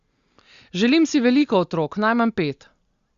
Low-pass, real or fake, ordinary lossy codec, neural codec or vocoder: 7.2 kHz; real; Opus, 64 kbps; none